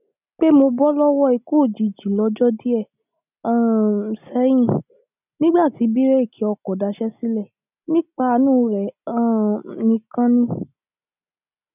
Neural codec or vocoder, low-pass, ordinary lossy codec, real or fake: none; 3.6 kHz; none; real